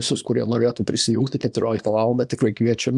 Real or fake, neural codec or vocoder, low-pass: fake; codec, 24 kHz, 0.9 kbps, WavTokenizer, small release; 10.8 kHz